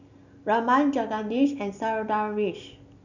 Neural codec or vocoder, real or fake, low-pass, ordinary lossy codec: none; real; 7.2 kHz; none